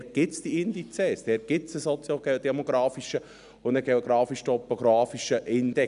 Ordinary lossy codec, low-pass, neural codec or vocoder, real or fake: none; 10.8 kHz; none; real